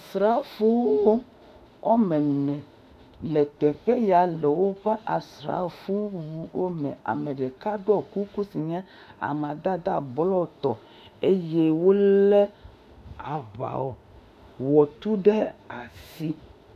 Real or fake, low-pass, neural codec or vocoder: fake; 14.4 kHz; autoencoder, 48 kHz, 32 numbers a frame, DAC-VAE, trained on Japanese speech